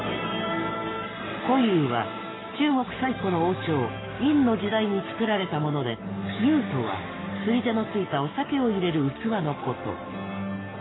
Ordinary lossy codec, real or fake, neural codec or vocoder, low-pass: AAC, 16 kbps; fake; codec, 44.1 kHz, 7.8 kbps, Pupu-Codec; 7.2 kHz